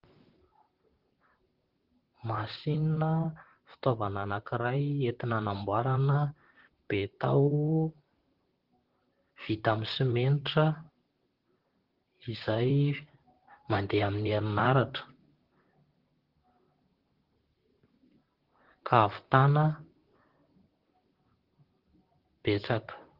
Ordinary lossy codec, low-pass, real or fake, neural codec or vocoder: Opus, 16 kbps; 5.4 kHz; fake; vocoder, 44.1 kHz, 128 mel bands, Pupu-Vocoder